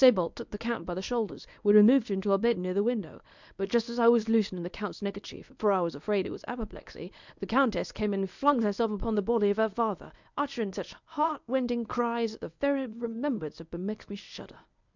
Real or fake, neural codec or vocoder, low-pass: fake; codec, 24 kHz, 0.9 kbps, WavTokenizer, medium speech release version 1; 7.2 kHz